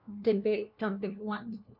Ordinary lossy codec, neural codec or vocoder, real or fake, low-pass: none; codec, 16 kHz, 1 kbps, FunCodec, trained on LibriTTS, 50 frames a second; fake; 5.4 kHz